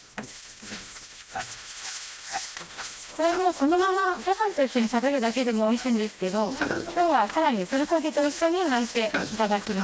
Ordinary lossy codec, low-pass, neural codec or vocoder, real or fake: none; none; codec, 16 kHz, 1 kbps, FreqCodec, smaller model; fake